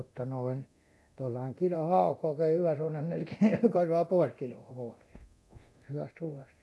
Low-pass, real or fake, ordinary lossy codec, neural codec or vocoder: none; fake; none; codec, 24 kHz, 0.9 kbps, DualCodec